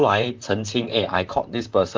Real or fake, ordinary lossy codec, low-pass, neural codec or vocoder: fake; Opus, 32 kbps; 7.2 kHz; vocoder, 44.1 kHz, 128 mel bands, Pupu-Vocoder